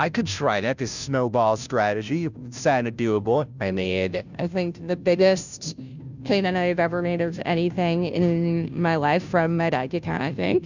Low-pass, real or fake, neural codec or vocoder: 7.2 kHz; fake; codec, 16 kHz, 0.5 kbps, FunCodec, trained on Chinese and English, 25 frames a second